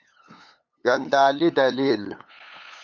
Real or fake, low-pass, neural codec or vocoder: fake; 7.2 kHz; codec, 16 kHz, 8 kbps, FunCodec, trained on LibriTTS, 25 frames a second